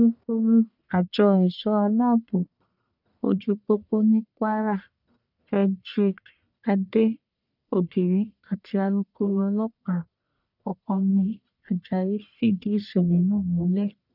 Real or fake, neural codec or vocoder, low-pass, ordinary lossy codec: fake; codec, 44.1 kHz, 1.7 kbps, Pupu-Codec; 5.4 kHz; none